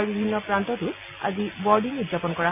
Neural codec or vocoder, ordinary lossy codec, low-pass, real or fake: none; none; 3.6 kHz; real